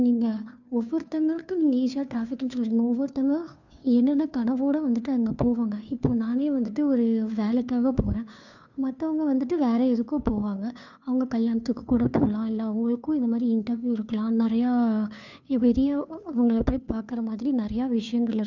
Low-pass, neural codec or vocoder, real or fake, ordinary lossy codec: 7.2 kHz; codec, 16 kHz, 2 kbps, FunCodec, trained on Chinese and English, 25 frames a second; fake; none